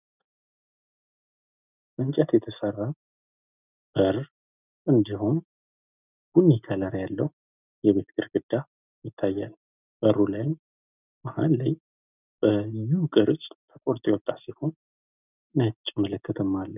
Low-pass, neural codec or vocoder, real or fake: 3.6 kHz; none; real